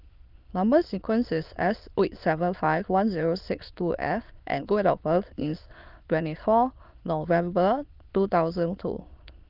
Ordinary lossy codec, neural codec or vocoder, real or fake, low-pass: Opus, 32 kbps; autoencoder, 22.05 kHz, a latent of 192 numbers a frame, VITS, trained on many speakers; fake; 5.4 kHz